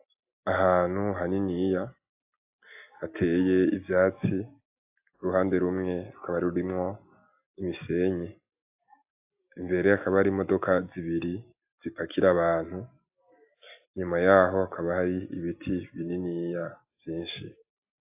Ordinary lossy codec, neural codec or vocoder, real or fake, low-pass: AAC, 32 kbps; none; real; 3.6 kHz